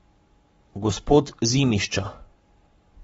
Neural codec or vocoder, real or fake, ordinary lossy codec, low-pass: codec, 44.1 kHz, 7.8 kbps, Pupu-Codec; fake; AAC, 24 kbps; 19.8 kHz